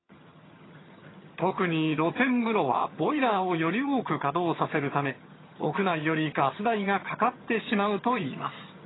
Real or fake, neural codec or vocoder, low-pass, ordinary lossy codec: fake; vocoder, 22.05 kHz, 80 mel bands, HiFi-GAN; 7.2 kHz; AAC, 16 kbps